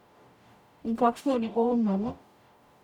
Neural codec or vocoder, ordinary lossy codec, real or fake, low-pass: codec, 44.1 kHz, 0.9 kbps, DAC; none; fake; 19.8 kHz